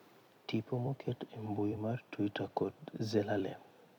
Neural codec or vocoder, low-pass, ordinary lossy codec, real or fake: vocoder, 44.1 kHz, 128 mel bands every 256 samples, BigVGAN v2; 19.8 kHz; MP3, 96 kbps; fake